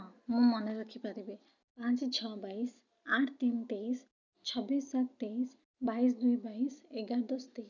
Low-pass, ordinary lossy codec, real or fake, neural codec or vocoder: 7.2 kHz; none; real; none